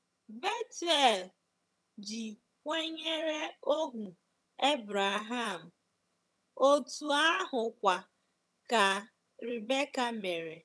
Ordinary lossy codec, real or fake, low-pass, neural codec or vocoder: none; fake; none; vocoder, 22.05 kHz, 80 mel bands, HiFi-GAN